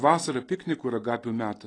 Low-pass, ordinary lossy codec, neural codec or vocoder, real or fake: 9.9 kHz; AAC, 32 kbps; none; real